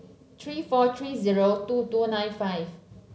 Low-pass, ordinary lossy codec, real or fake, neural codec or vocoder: none; none; real; none